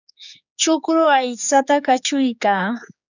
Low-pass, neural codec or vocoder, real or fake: 7.2 kHz; codec, 16 kHz, 4 kbps, X-Codec, HuBERT features, trained on general audio; fake